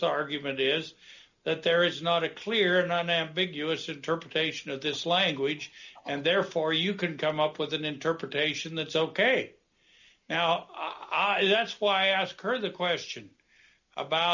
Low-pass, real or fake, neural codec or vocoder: 7.2 kHz; real; none